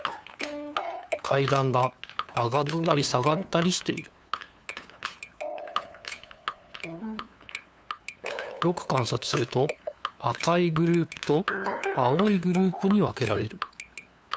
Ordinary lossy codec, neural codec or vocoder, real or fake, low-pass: none; codec, 16 kHz, 2 kbps, FunCodec, trained on LibriTTS, 25 frames a second; fake; none